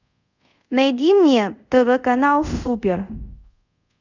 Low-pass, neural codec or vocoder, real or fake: 7.2 kHz; codec, 24 kHz, 0.5 kbps, DualCodec; fake